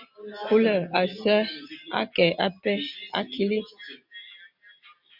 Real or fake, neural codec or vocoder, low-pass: real; none; 5.4 kHz